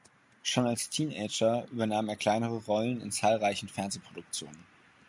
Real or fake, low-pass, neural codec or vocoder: real; 10.8 kHz; none